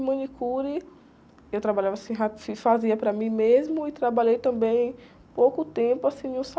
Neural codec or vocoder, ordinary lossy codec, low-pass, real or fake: none; none; none; real